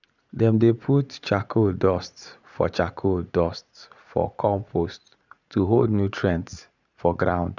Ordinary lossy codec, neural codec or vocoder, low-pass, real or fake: none; vocoder, 44.1 kHz, 80 mel bands, Vocos; 7.2 kHz; fake